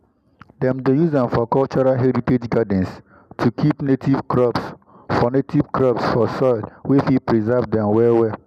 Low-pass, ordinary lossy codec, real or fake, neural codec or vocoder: 14.4 kHz; none; real; none